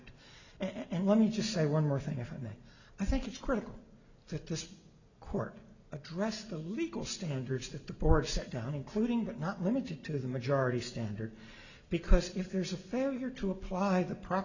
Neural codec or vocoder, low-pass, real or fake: none; 7.2 kHz; real